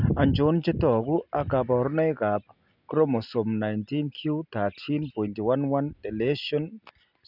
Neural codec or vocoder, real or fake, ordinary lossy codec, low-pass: none; real; none; 5.4 kHz